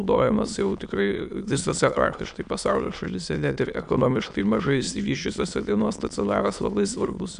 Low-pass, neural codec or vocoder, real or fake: 9.9 kHz; autoencoder, 22.05 kHz, a latent of 192 numbers a frame, VITS, trained on many speakers; fake